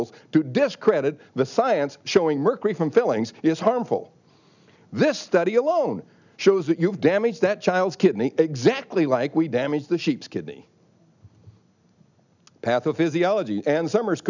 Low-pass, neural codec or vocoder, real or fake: 7.2 kHz; none; real